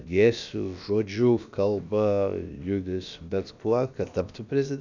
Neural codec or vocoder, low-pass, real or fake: codec, 16 kHz, about 1 kbps, DyCAST, with the encoder's durations; 7.2 kHz; fake